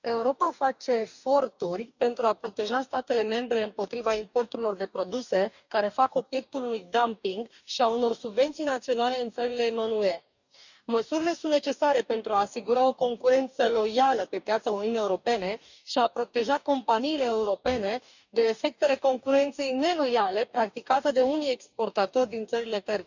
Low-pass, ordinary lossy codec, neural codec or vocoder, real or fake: 7.2 kHz; none; codec, 44.1 kHz, 2.6 kbps, DAC; fake